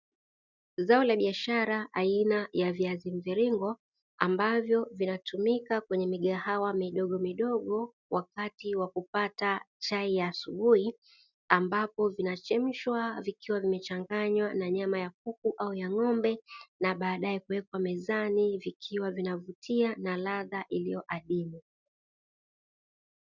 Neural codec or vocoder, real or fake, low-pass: none; real; 7.2 kHz